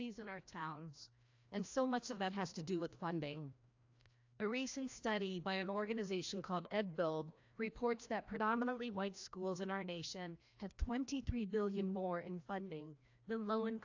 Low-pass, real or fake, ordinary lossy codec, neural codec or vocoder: 7.2 kHz; fake; AAC, 48 kbps; codec, 16 kHz, 1 kbps, FreqCodec, larger model